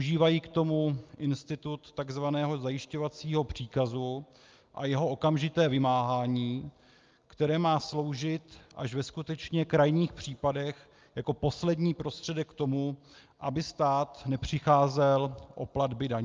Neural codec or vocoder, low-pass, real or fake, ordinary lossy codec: none; 7.2 kHz; real; Opus, 24 kbps